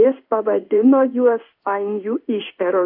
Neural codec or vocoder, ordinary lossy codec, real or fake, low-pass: codec, 16 kHz in and 24 kHz out, 1 kbps, XY-Tokenizer; AAC, 48 kbps; fake; 5.4 kHz